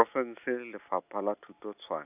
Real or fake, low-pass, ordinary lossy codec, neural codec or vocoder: real; 3.6 kHz; none; none